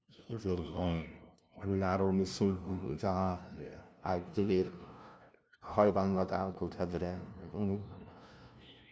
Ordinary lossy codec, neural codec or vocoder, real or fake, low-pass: none; codec, 16 kHz, 0.5 kbps, FunCodec, trained on LibriTTS, 25 frames a second; fake; none